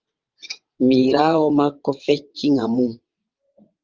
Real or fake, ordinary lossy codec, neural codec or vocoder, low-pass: fake; Opus, 24 kbps; vocoder, 22.05 kHz, 80 mel bands, WaveNeXt; 7.2 kHz